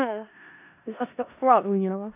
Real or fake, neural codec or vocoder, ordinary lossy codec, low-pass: fake; codec, 16 kHz in and 24 kHz out, 0.4 kbps, LongCat-Audio-Codec, four codebook decoder; none; 3.6 kHz